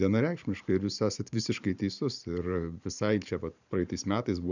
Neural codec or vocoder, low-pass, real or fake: vocoder, 44.1 kHz, 128 mel bands every 512 samples, BigVGAN v2; 7.2 kHz; fake